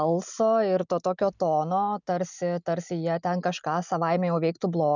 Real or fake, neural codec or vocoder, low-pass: real; none; 7.2 kHz